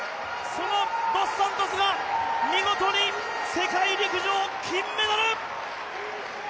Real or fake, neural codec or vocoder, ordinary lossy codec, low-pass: real; none; none; none